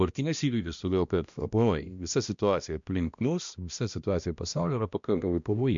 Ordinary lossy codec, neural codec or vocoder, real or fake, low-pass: MP3, 64 kbps; codec, 16 kHz, 1 kbps, X-Codec, HuBERT features, trained on balanced general audio; fake; 7.2 kHz